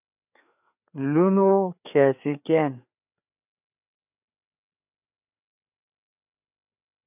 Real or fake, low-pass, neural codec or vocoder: fake; 3.6 kHz; codec, 16 kHz, 4 kbps, FreqCodec, larger model